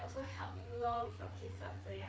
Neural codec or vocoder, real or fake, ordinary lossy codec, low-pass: codec, 16 kHz, 4 kbps, FreqCodec, larger model; fake; none; none